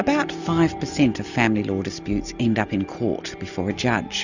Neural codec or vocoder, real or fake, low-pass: none; real; 7.2 kHz